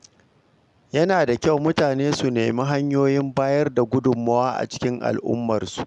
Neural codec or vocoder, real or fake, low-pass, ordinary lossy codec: none; real; 10.8 kHz; MP3, 96 kbps